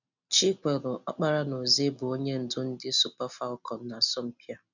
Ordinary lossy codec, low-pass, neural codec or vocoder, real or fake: none; 7.2 kHz; none; real